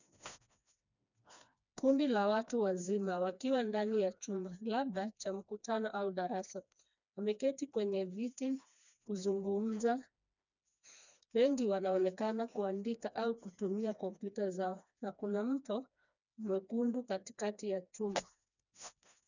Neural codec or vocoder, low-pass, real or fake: codec, 16 kHz, 2 kbps, FreqCodec, smaller model; 7.2 kHz; fake